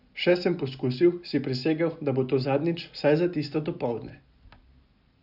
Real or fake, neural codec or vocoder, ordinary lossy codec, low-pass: real; none; none; 5.4 kHz